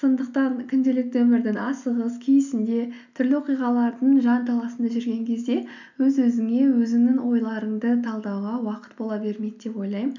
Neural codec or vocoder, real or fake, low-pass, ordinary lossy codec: none; real; 7.2 kHz; none